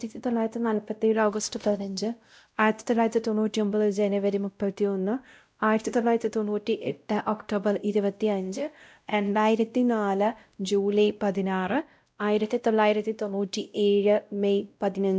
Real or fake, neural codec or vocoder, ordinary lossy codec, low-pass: fake; codec, 16 kHz, 0.5 kbps, X-Codec, WavLM features, trained on Multilingual LibriSpeech; none; none